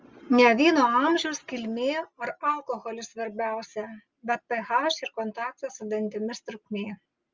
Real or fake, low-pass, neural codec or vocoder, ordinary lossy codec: real; 7.2 kHz; none; Opus, 32 kbps